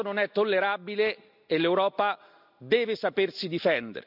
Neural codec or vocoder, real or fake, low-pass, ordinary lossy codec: none; real; 5.4 kHz; none